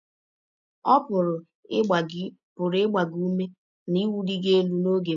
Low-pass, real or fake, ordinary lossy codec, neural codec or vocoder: 7.2 kHz; real; none; none